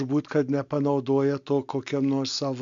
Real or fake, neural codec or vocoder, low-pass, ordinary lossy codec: real; none; 7.2 kHz; MP3, 64 kbps